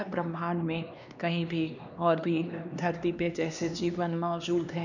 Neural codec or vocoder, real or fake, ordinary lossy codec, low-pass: codec, 16 kHz, 2 kbps, X-Codec, HuBERT features, trained on LibriSpeech; fake; none; 7.2 kHz